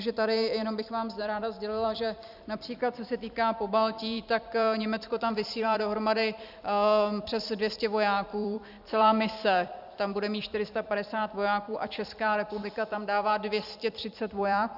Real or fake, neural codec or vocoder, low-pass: fake; vocoder, 44.1 kHz, 128 mel bands every 256 samples, BigVGAN v2; 5.4 kHz